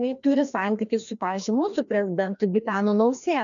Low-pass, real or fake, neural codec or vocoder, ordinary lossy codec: 7.2 kHz; fake; codec, 16 kHz, 1 kbps, FreqCodec, larger model; MP3, 96 kbps